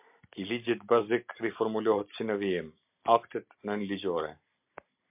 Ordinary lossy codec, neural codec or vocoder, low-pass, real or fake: MP3, 32 kbps; none; 3.6 kHz; real